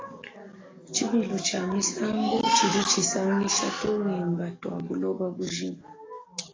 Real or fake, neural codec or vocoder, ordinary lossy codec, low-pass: fake; codec, 44.1 kHz, 7.8 kbps, DAC; AAC, 32 kbps; 7.2 kHz